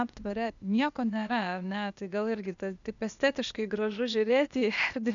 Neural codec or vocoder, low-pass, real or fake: codec, 16 kHz, 0.8 kbps, ZipCodec; 7.2 kHz; fake